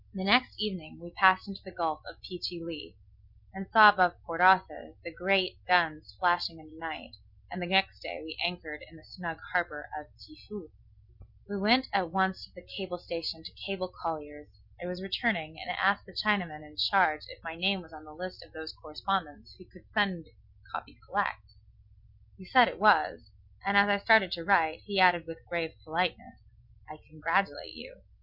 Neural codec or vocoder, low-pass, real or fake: none; 5.4 kHz; real